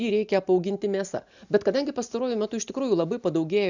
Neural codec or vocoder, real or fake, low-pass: none; real; 7.2 kHz